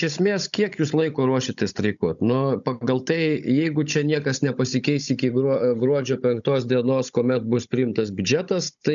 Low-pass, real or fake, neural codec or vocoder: 7.2 kHz; fake; codec, 16 kHz, 16 kbps, FunCodec, trained on Chinese and English, 50 frames a second